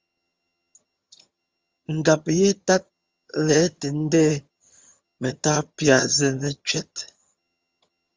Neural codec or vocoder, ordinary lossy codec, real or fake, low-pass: vocoder, 22.05 kHz, 80 mel bands, HiFi-GAN; Opus, 32 kbps; fake; 7.2 kHz